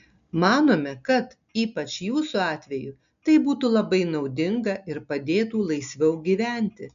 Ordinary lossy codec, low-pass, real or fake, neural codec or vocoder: AAC, 96 kbps; 7.2 kHz; real; none